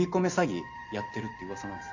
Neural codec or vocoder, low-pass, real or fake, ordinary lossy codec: none; 7.2 kHz; real; none